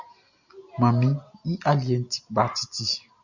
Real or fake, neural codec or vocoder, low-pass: real; none; 7.2 kHz